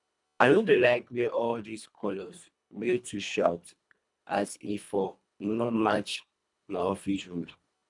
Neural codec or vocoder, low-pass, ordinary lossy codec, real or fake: codec, 24 kHz, 1.5 kbps, HILCodec; 10.8 kHz; none; fake